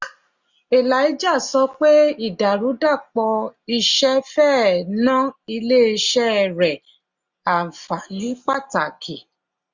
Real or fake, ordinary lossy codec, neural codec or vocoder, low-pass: real; Opus, 64 kbps; none; 7.2 kHz